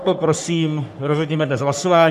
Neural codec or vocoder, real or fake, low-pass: codec, 44.1 kHz, 3.4 kbps, Pupu-Codec; fake; 14.4 kHz